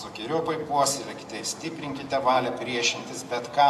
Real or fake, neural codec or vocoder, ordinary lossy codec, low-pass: real; none; AAC, 96 kbps; 14.4 kHz